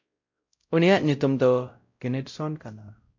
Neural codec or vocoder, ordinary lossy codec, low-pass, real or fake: codec, 16 kHz, 0.5 kbps, X-Codec, WavLM features, trained on Multilingual LibriSpeech; MP3, 48 kbps; 7.2 kHz; fake